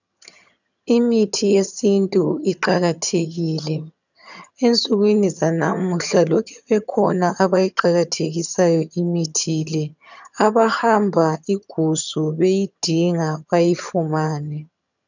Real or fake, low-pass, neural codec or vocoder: fake; 7.2 kHz; vocoder, 22.05 kHz, 80 mel bands, HiFi-GAN